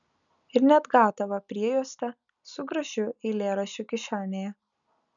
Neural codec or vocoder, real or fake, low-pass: none; real; 7.2 kHz